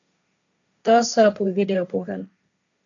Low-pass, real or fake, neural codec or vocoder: 7.2 kHz; fake; codec, 16 kHz, 1.1 kbps, Voila-Tokenizer